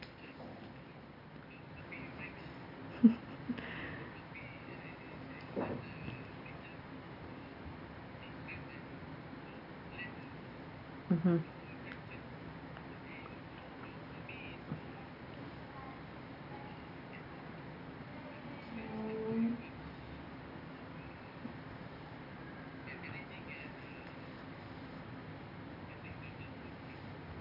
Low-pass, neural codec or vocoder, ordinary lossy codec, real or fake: 5.4 kHz; none; none; real